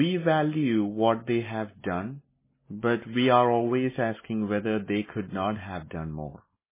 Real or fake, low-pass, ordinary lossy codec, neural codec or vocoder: real; 3.6 kHz; MP3, 16 kbps; none